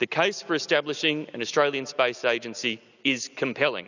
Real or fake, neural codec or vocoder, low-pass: real; none; 7.2 kHz